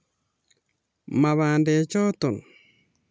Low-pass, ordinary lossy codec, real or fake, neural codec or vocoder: none; none; real; none